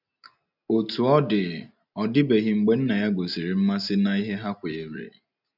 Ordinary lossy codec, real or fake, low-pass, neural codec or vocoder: none; real; 5.4 kHz; none